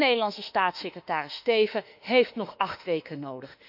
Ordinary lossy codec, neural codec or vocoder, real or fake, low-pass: none; autoencoder, 48 kHz, 32 numbers a frame, DAC-VAE, trained on Japanese speech; fake; 5.4 kHz